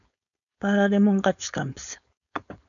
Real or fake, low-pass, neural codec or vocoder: fake; 7.2 kHz; codec, 16 kHz, 4.8 kbps, FACodec